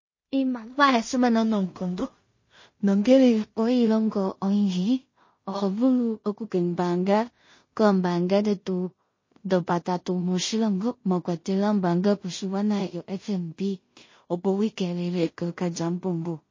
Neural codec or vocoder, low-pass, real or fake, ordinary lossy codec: codec, 16 kHz in and 24 kHz out, 0.4 kbps, LongCat-Audio-Codec, two codebook decoder; 7.2 kHz; fake; MP3, 32 kbps